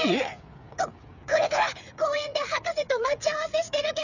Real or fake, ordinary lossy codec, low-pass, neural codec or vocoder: fake; none; 7.2 kHz; codec, 16 kHz, 8 kbps, FreqCodec, smaller model